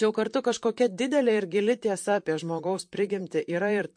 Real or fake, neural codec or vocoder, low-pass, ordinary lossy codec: fake; vocoder, 44.1 kHz, 128 mel bands, Pupu-Vocoder; 9.9 kHz; MP3, 48 kbps